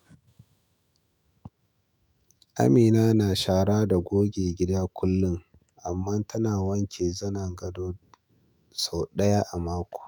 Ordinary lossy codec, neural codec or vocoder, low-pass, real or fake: none; autoencoder, 48 kHz, 128 numbers a frame, DAC-VAE, trained on Japanese speech; none; fake